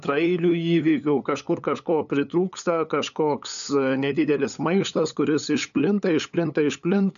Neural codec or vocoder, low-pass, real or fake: codec, 16 kHz, 8 kbps, FunCodec, trained on LibriTTS, 25 frames a second; 7.2 kHz; fake